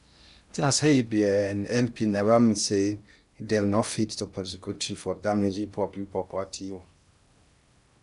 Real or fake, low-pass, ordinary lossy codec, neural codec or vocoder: fake; 10.8 kHz; none; codec, 16 kHz in and 24 kHz out, 0.6 kbps, FocalCodec, streaming, 2048 codes